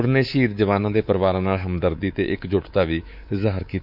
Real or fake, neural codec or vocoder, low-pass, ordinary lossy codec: fake; codec, 24 kHz, 3.1 kbps, DualCodec; 5.4 kHz; none